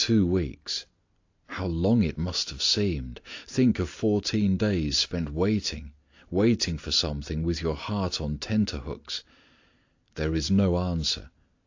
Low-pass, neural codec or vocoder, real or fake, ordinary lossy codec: 7.2 kHz; none; real; AAC, 48 kbps